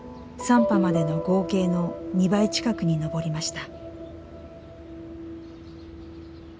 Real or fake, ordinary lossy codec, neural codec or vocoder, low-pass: real; none; none; none